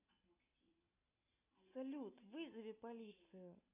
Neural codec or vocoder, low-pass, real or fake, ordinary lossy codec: none; 3.6 kHz; real; AAC, 24 kbps